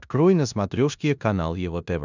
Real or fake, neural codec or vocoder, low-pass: fake; codec, 16 kHz in and 24 kHz out, 0.9 kbps, LongCat-Audio-Codec, four codebook decoder; 7.2 kHz